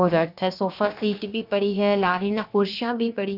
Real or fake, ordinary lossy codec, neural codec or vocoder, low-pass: fake; none; codec, 16 kHz, about 1 kbps, DyCAST, with the encoder's durations; 5.4 kHz